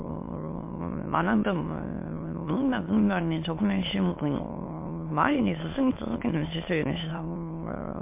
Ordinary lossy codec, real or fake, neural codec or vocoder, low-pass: MP3, 24 kbps; fake; autoencoder, 22.05 kHz, a latent of 192 numbers a frame, VITS, trained on many speakers; 3.6 kHz